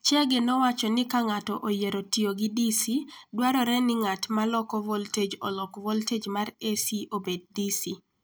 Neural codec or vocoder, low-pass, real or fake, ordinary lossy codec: none; none; real; none